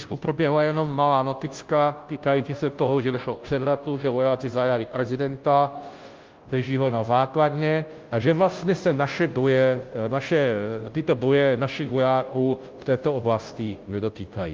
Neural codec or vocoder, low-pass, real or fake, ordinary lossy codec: codec, 16 kHz, 0.5 kbps, FunCodec, trained on Chinese and English, 25 frames a second; 7.2 kHz; fake; Opus, 32 kbps